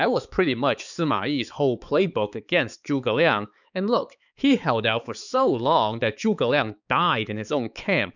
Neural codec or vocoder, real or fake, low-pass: codec, 16 kHz, 4 kbps, X-Codec, HuBERT features, trained on balanced general audio; fake; 7.2 kHz